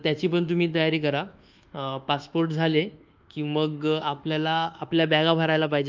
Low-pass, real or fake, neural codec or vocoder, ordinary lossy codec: 7.2 kHz; fake; codec, 24 kHz, 1.2 kbps, DualCodec; Opus, 24 kbps